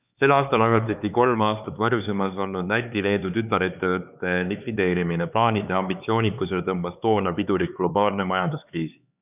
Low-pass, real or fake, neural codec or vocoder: 3.6 kHz; fake; codec, 16 kHz, 4 kbps, X-Codec, HuBERT features, trained on LibriSpeech